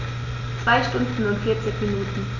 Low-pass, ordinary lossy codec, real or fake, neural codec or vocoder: 7.2 kHz; none; real; none